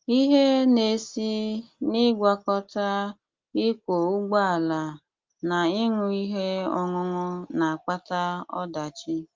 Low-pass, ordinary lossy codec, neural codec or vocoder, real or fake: 7.2 kHz; Opus, 32 kbps; none; real